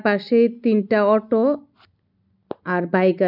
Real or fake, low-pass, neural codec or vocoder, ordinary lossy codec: real; 5.4 kHz; none; none